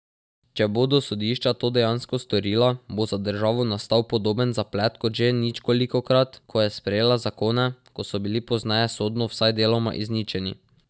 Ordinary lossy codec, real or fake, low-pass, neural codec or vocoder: none; real; none; none